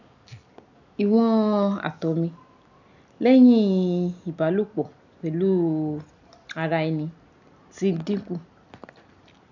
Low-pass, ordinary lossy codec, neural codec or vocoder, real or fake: 7.2 kHz; none; none; real